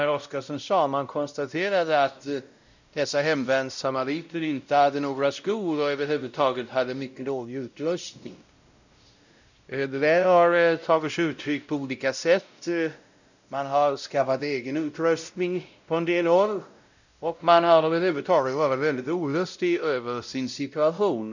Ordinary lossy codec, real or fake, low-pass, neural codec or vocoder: none; fake; 7.2 kHz; codec, 16 kHz, 0.5 kbps, X-Codec, WavLM features, trained on Multilingual LibriSpeech